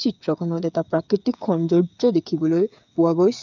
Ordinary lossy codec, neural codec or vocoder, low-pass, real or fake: none; codec, 16 kHz, 8 kbps, FreqCodec, smaller model; 7.2 kHz; fake